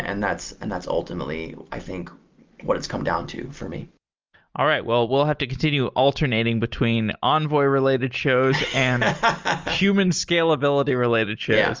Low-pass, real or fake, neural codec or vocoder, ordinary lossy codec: 7.2 kHz; real; none; Opus, 32 kbps